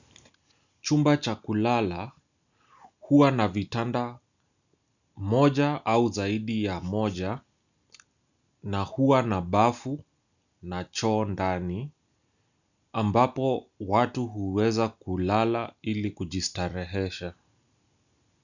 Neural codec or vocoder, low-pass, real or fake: none; 7.2 kHz; real